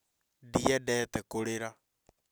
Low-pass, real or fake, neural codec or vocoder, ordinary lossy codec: none; real; none; none